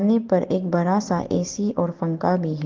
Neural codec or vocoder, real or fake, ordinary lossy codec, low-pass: none; real; Opus, 16 kbps; 7.2 kHz